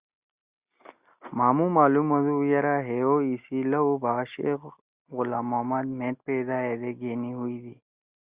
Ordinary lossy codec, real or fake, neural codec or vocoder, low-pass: Opus, 64 kbps; real; none; 3.6 kHz